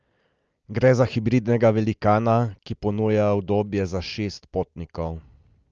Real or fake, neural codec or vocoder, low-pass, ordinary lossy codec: real; none; 7.2 kHz; Opus, 24 kbps